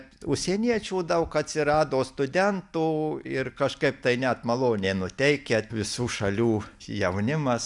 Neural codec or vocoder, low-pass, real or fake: none; 10.8 kHz; real